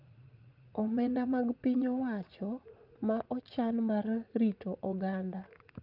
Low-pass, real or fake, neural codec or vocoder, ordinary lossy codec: 5.4 kHz; fake; vocoder, 44.1 kHz, 80 mel bands, Vocos; Opus, 24 kbps